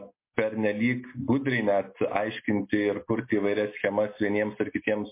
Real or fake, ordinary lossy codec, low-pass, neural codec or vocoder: real; MP3, 24 kbps; 3.6 kHz; none